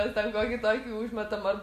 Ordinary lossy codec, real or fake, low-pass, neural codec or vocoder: MP3, 64 kbps; real; 14.4 kHz; none